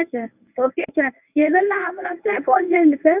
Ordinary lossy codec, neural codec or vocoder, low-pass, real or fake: none; codec, 24 kHz, 0.9 kbps, WavTokenizer, medium speech release version 1; 3.6 kHz; fake